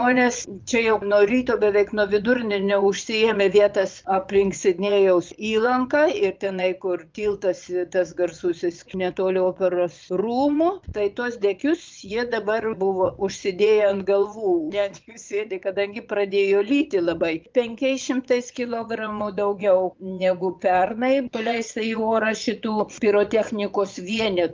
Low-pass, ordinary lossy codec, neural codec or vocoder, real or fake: 7.2 kHz; Opus, 32 kbps; vocoder, 22.05 kHz, 80 mel bands, Vocos; fake